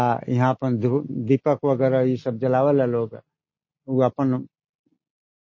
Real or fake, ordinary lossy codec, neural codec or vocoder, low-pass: real; MP3, 32 kbps; none; 7.2 kHz